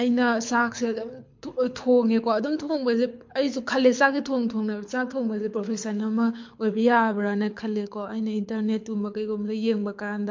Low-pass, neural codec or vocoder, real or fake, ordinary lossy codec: 7.2 kHz; codec, 24 kHz, 6 kbps, HILCodec; fake; MP3, 48 kbps